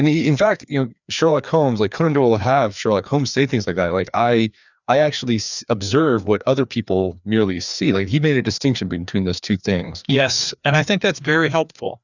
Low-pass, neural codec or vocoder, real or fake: 7.2 kHz; codec, 16 kHz, 2 kbps, FreqCodec, larger model; fake